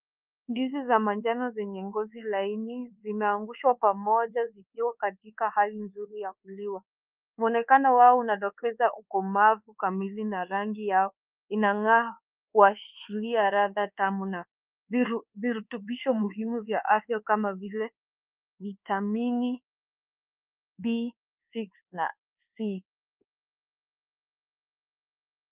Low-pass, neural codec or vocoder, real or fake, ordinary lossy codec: 3.6 kHz; codec, 24 kHz, 1.2 kbps, DualCodec; fake; Opus, 24 kbps